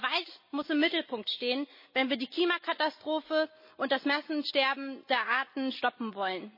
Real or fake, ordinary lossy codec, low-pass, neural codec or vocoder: real; MP3, 24 kbps; 5.4 kHz; none